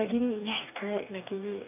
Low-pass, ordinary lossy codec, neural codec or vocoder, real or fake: 3.6 kHz; none; codec, 44.1 kHz, 2.6 kbps, DAC; fake